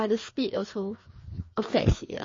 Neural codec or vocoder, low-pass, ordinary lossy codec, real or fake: codec, 16 kHz, 2 kbps, FreqCodec, larger model; 7.2 kHz; MP3, 32 kbps; fake